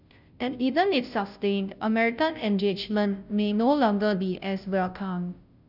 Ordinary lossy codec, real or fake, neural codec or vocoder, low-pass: none; fake; codec, 16 kHz, 0.5 kbps, FunCodec, trained on Chinese and English, 25 frames a second; 5.4 kHz